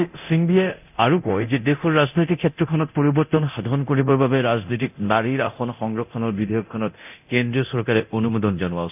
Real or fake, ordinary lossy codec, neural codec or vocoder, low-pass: fake; none; codec, 24 kHz, 0.9 kbps, DualCodec; 3.6 kHz